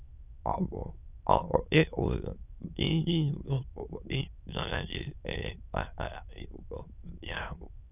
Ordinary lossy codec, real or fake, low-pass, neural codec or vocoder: none; fake; 3.6 kHz; autoencoder, 22.05 kHz, a latent of 192 numbers a frame, VITS, trained on many speakers